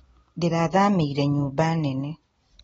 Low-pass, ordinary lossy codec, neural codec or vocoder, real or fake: 19.8 kHz; AAC, 24 kbps; none; real